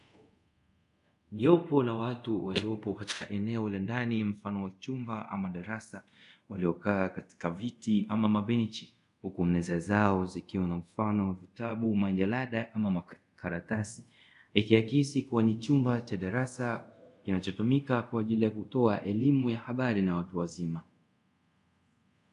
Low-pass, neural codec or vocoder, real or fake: 10.8 kHz; codec, 24 kHz, 0.5 kbps, DualCodec; fake